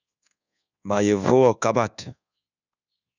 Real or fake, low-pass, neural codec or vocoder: fake; 7.2 kHz; codec, 24 kHz, 1.2 kbps, DualCodec